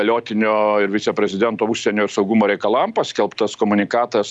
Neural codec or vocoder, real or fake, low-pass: none; real; 10.8 kHz